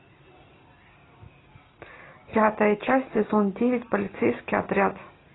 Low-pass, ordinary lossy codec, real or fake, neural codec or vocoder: 7.2 kHz; AAC, 16 kbps; real; none